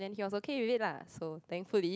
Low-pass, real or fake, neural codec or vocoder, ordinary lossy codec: none; real; none; none